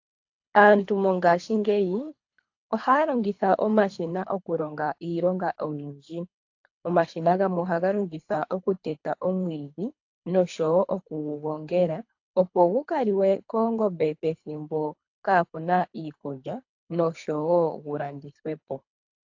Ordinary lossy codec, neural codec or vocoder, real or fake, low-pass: AAC, 48 kbps; codec, 24 kHz, 3 kbps, HILCodec; fake; 7.2 kHz